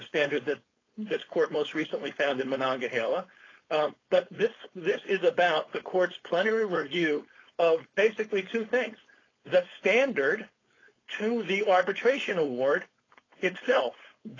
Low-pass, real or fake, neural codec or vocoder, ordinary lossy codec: 7.2 kHz; fake; codec, 16 kHz, 4.8 kbps, FACodec; AAC, 32 kbps